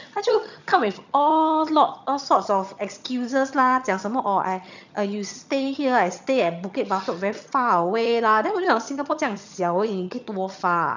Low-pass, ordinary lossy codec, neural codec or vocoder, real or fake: 7.2 kHz; none; vocoder, 22.05 kHz, 80 mel bands, HiFi-GAN; fake